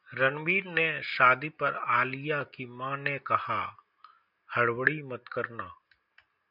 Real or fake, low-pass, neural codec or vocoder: real; 5.4 kHz; none